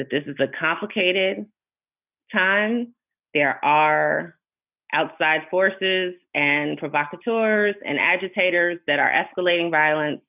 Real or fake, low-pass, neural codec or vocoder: real; 3.6 kHz; none